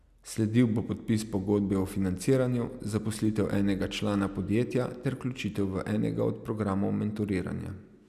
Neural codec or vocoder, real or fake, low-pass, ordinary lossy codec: none; real; 14.4 kHz; none